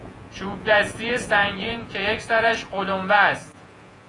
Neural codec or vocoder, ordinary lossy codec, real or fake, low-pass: vocoder, 48 kHz, 128 mel bands, Vocos; AAC, 32 kbps; fake; 10.8 kHz